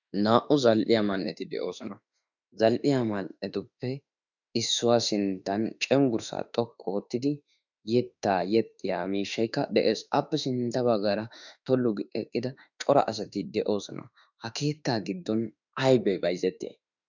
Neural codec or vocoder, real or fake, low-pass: autoencoder, 48 kHz, 32 numbers a frame, DAC-VAE, trained on Japanese speech; fake; 7.2 kHz